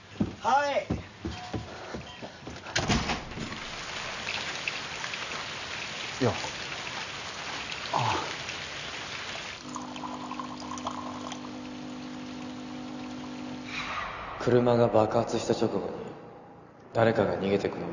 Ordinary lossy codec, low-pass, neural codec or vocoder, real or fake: none; 7.2 kHz; none; real